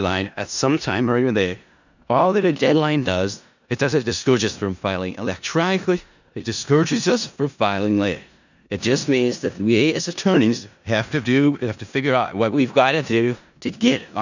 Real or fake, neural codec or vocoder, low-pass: fake; codec, 16 kHz in and 24 kHz out, 0.4 kbps, LongCat-Audio-Codec, four codebook decoder; 7.2 kHz